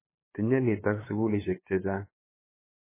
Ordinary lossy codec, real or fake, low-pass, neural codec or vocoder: MP3, 16 kbps; fake; 3.6 kHz; codec, 16 kHz, 8 kbps, FunCodec, trained on LibriTTS, 25 frames a second